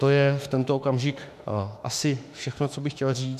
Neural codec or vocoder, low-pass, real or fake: autoencoder, 48 kHz, 32 numbers a frame, DAC-VAE, trained on Japanese speech; 14.4 kHz; fake